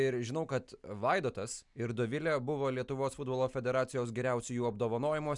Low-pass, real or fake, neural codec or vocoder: 10.8 kHz; real; none